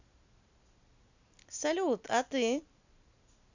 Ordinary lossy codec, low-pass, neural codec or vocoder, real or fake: none; 7.2 kHz; none; real